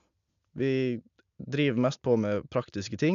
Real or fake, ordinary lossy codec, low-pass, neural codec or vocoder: real; none; 7.2 kHz; none